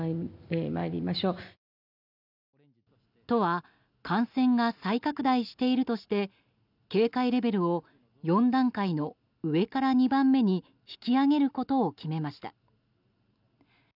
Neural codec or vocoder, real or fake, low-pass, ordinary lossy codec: none; real; 5.4 kHz; none